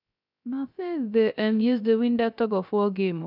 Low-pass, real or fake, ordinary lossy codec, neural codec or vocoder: 5.4 kHz; fake; none; codec, 16 kHz, 0.3 kbps, FocalCodec